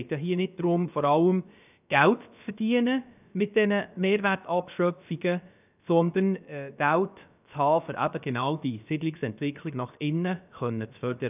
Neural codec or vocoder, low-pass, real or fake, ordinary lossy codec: codec, 16 kHz, about 1 kbps, DyCAST, with the encoder's durations; 3.6 kHz; fake; none